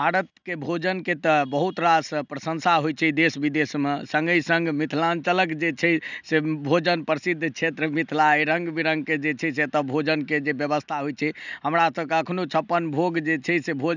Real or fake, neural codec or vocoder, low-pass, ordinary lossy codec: real; none; 7.2 kHz; none